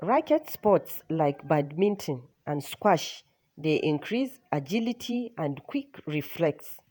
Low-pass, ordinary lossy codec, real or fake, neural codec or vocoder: none; none; fake; vocoder, 48 kHz, 128 mel bands, Vocos